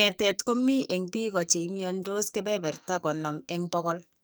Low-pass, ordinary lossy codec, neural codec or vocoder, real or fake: none; none; codec, 44.1 kHz, 2.6 kbps, SNAC; fake